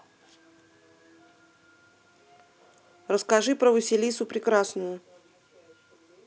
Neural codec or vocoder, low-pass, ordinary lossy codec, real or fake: none; none; none; real